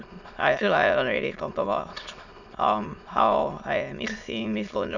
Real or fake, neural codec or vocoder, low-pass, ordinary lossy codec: fake; autoencoder, 22.05 kHz, a latent of 192 numbers a frame, VITS, trained on many speakers; 7.2 kHz; none